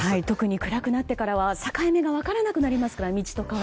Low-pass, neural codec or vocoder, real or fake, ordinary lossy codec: none; none; real; none